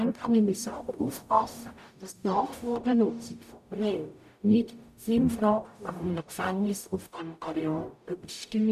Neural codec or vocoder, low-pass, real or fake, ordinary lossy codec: codec, 44.1 kHz, 0.9 kbps, DAC; 14.4 kHz; fake; none